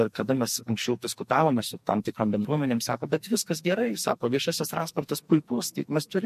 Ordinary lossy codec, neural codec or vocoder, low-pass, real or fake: MP3, 64 kbps; codec, 44.1 kHz, 2.6 kbps, SNAC; 14.4 kHz; fake